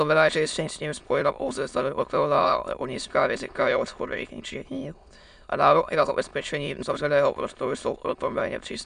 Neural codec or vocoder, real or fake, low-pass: autoencoder, 22.05 kHz, a latent of 192 numbers a frame, VITS, trained on many speakers; fake; 9.9 kHz